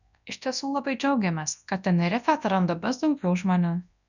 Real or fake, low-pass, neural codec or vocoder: fake; 7.2 kHz; codec, 24 kHz, 0.9 kbps, WavTokenizer, large speech release